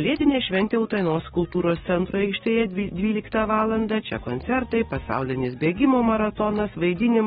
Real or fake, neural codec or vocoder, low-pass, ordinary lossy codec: fake; codec, 44.1 kHz, 7.8 kbps, DAC; 19.8 kHz; AAC, 16 kbps